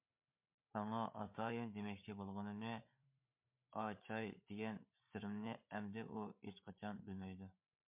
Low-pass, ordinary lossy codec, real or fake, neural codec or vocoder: 3.6 kHz; MP3, 32 kbps; fake; codec, 16 kHz, 8 kbps, FreqCodec, larger model